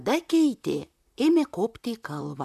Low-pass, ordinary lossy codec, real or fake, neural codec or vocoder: 14.4 kHz; MP3, 96 kbps; fake; vocoder, 44.1 kHz, 128 mel bands, Pupu-Vocoder